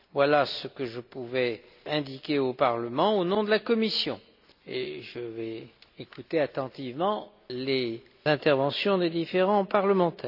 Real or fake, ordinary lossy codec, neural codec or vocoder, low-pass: real; none; none; 5.4 kHz